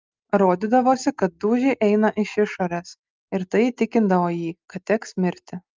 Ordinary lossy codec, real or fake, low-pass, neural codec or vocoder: Opus, 24 kbps; real; 7.2 kHz; none